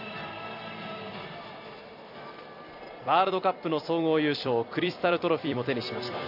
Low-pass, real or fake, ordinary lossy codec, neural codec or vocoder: 5.4 kHz; fake; none; vocoder, 44.1 kHz, 128 mel bands every 256 samples, BigVGAN v2